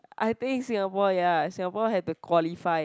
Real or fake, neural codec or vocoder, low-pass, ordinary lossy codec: real; none; none; none